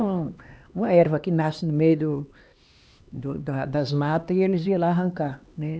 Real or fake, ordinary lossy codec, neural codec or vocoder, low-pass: fake; none; codec, 16 kHz, 2 kbps, X-Codec, HuBERT features, trained on LibriSpeech; none